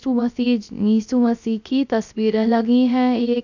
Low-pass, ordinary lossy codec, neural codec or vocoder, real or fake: 7.2 kHz; none; codec, 16 kHz, 0.3 kbps, FocalCodec; fake